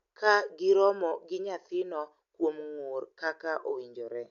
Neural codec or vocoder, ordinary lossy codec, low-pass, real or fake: none; AAC, 64 kbps; 7.2 kHz; real